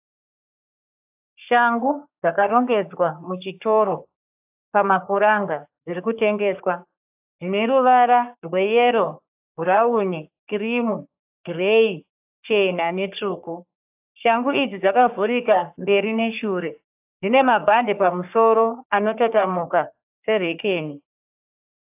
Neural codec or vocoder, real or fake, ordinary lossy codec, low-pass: codec, 44.1 kHz, 3.4 kbps, Pupu-Codec; fake; AAC, 32 kbps; 3.6 kHz